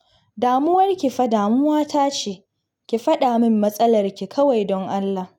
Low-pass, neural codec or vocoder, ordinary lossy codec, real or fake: 19.8 kHz; none; none; real